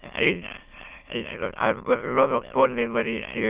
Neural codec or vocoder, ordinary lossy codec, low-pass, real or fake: autoencoder, 22.05 kHz, a latent of 192 numbers a frame, VITS, trained on many speakers; Opus, 32 kbps; 3.6 kHz; fake